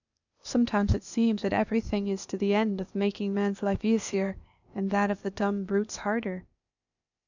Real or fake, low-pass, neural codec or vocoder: fake; 7.2 kHz; codec, 16 kHz, 0.8 kbps, ZipCodec